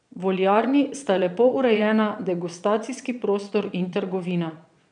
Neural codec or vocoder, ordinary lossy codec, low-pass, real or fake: vocoder, 22.05 kHz, 80 mel bands, Vocos; none; 9.9 kHz; fake